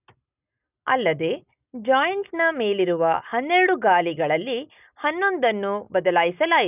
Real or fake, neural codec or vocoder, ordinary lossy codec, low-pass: real; none; none; 3.6 kHz